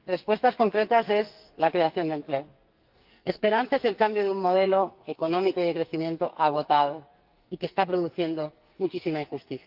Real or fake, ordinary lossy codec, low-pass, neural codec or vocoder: fake; Opus, 32 kbps; 5.4 kHz; codec, 44.1 kHz, 2.6 kbps, SNAC